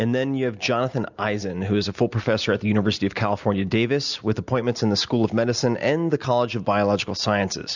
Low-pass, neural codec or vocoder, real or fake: 7.2 kHz; none; real